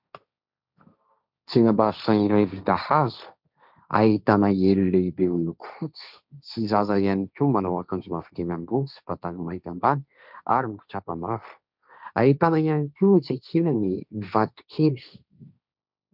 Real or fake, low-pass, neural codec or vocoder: fake; 5.4 kHz; codec, 16 kHz, 1.1 kbps, Voila-Tokenizer